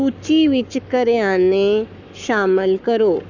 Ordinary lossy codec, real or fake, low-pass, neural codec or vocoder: none; fake; 7.2 kHz; codec, 44.1 kHz, 7.8 kbps, Pupu-Codec